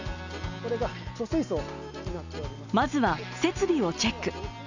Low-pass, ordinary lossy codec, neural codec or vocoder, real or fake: 7.2 kHz; none; none; real